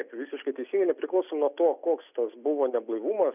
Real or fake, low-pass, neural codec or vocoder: real; 3.6 kHz; none